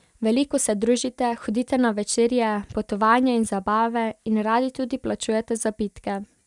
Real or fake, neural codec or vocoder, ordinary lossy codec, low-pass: real; none; none; 10.8 kHz